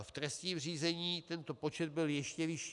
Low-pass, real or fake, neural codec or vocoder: 10.8 kHz; real; none